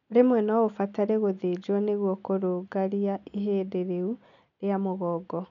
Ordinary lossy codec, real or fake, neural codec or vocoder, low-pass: none; real; none; 7.2 kHz